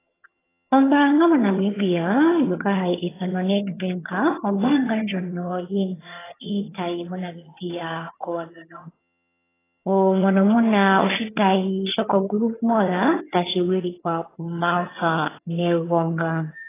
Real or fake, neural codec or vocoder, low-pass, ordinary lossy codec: fake; vocoder, 22.05 kHz, 80 mel bands, HiFi-GAN; 3.6 kHz; AAC, 16 kbps